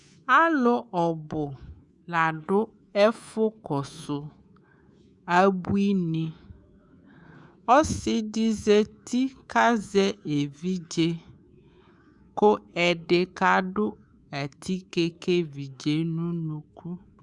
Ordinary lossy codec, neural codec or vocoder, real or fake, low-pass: Opus, 64 kbps; codec, 24 kHz, 3.1 kbps, DualCodec; fake; 10.8 kHz